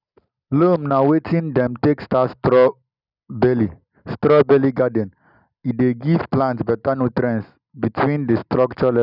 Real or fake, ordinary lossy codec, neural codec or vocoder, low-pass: real; none; none; 5.4 kHz